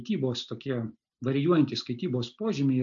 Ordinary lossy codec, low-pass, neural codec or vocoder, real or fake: AAC, 64 kbps; 7.2 kHz; none; real